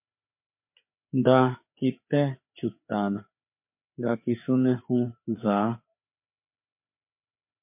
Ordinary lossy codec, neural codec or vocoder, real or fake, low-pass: MP3, 32 kbps; codec, 16 kHz, 4 kbps, FreqCodec, larger model; fake; 3.6 kHz